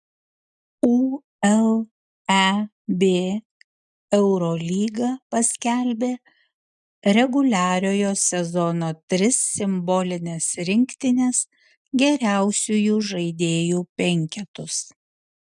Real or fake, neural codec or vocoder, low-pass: real; none; 10.8 kHz